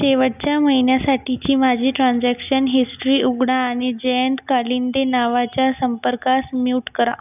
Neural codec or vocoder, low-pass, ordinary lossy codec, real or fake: none; 3.6 kHz; none; real